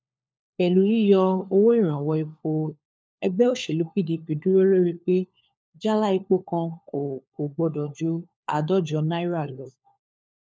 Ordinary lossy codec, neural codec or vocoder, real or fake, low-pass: none; codec, 16 kHz, 4 kbps, FunCodec, trained on LibriTTS, 50 frames a second; fake; none